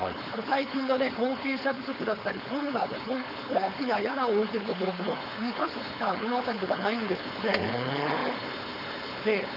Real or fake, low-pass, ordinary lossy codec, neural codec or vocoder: fake; 5.4 kHz; none; codec, 16 kHz, 4.8 kbps, FACodec